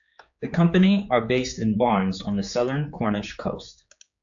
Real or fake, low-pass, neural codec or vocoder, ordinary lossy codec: fake; 7.2 kHz; codec, 16 kHz, 4 kbps, X-Codec, HuBERT features, trained on general audio; AAC, 64 kbps